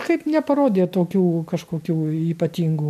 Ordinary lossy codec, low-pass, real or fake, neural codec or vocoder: MP3, 96 kbps; 14.4 kHz; real; none